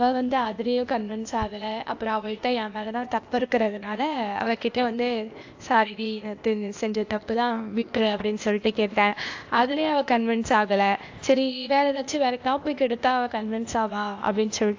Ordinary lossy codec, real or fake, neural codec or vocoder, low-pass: AAC, 48 kbps; fake; codec, 16 kHz, 0.8 kbps, ZipCodec; 7.2 kHz